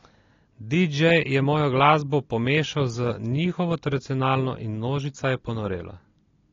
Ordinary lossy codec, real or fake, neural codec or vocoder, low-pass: AAC, 32 kbps; real; none; 7.2 kHz